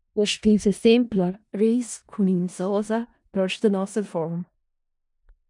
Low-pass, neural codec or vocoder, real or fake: 10.8 kHz; codec, 16 kHz in and 24 kHz out, 0.4 kbps, LongCat-Audio-Codec, four codebook decoder; fake